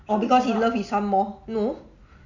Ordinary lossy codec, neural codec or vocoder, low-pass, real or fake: none; none; 7.2 kHz; real